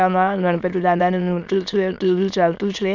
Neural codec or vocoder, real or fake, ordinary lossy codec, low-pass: autoencoder, 22.05 kHz, a latent of 192 numbers a frame, VITS, trained on many speakers; fake; none; 7.2 kHz